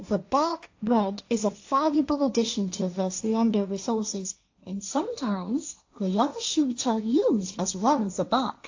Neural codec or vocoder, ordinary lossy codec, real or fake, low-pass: codec, 16 kHz, 1.1 kbps, Voila-Tokenizer; AAC, 48 kbps; fake; 7.2 kHz